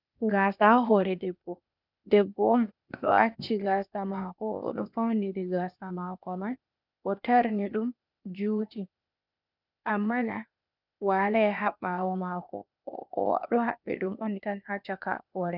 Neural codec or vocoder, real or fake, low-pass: codec, 16 kHz, 0.8 kbps, ZipCodec; fake; 5.4 kHz